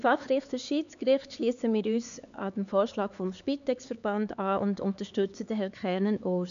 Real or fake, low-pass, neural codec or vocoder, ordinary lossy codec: fake; 7.2 kHz; codec, 16 kHz, 4 kbps, X-Codec, HuBERT features, trained on LibriSpeech; none